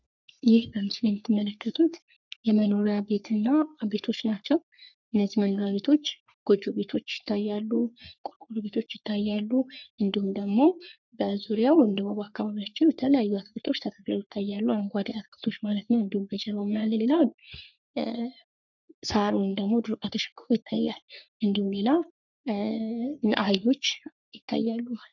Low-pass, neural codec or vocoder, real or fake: 7.2 kHz; codec, 44.1 kHz, 3.4 kbps, Pupu-Codec; fake